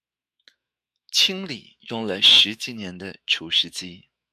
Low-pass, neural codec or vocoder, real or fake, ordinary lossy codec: 14.4 kHz; autoencoder, 48 kHz, 128 numbers a frame, DAC-VAE, trained on Japanese speech; fake; MP3, 96 kbps